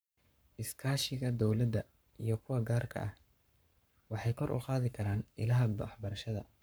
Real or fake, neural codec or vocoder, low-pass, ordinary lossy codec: fake; codec, 44.1 kHz, 7.8 kbps, Pupu-Codec; none; none